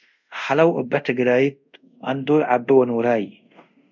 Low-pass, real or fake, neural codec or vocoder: 7.2 kHz; fake; codec, 24 kHz, 0.5 kbps, DualCodec